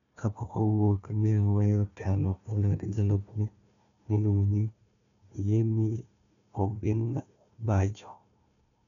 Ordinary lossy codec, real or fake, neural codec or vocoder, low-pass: none; fake; codec, 16 kHz, 1 kbps, FunCodec, trained on Chinese and English, 50 frames a second; 7.2 kHz